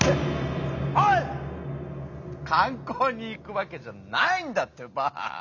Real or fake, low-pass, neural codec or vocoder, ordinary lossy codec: real; 7.2 kHz; none; none